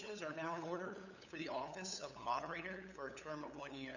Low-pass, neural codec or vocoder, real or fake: 7.2 kHz; codec, 16 kHz, 8 kbps, FunCodec, trained on LibriTTS, 25 frames a second; fake